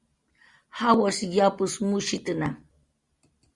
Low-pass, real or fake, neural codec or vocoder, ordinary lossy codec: 10.8 kHz; real; none; Opus, 64 kbps